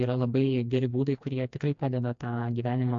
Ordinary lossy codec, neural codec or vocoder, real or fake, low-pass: AAC, 64 kbps; codec, 16 kHz, 2 kbps, FreqCodec, smaller model; fake; 7.2 kHz